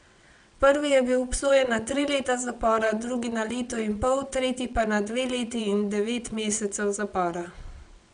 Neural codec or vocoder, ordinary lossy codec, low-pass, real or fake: vocoder, 22.05 kHz, 80 mel bands, WaveNeXt; none; 9.9 kHz; fake